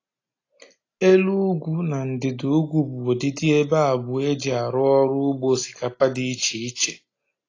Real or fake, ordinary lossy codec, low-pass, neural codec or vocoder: real; AAC, 32 kbps; 7.2 kHz; none